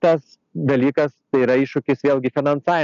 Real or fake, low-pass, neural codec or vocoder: real; 7.2 kHz; none